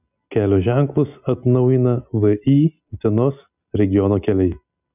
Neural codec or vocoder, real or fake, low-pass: none; real; 3.6 kHz